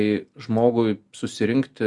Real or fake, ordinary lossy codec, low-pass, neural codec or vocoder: real; Opus, 64 kbps; 10.8 kHz; none